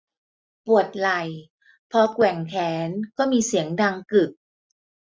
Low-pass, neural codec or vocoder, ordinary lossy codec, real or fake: none; none; none; real